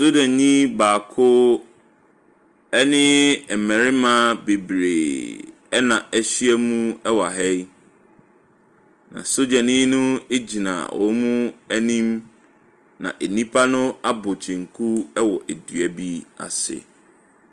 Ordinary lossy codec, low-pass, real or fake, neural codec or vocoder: Opus, 24 kbps; 10.8 kHz; real; none